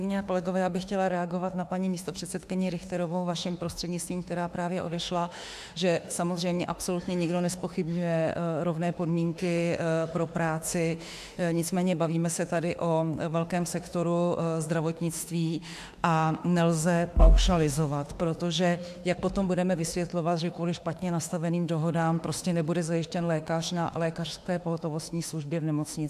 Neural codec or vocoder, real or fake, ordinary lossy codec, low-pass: autoencoder, 48 kHz, 32 numbers a frame, DAC-VAE, trained on Japanese speech; fake; AAC, 96 kbps; 14.4 kHz